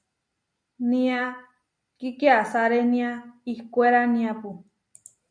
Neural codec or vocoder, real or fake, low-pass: none; real; 9.9 kHz